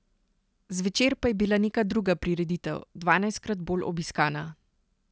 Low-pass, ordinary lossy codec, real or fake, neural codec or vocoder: none; none; real; none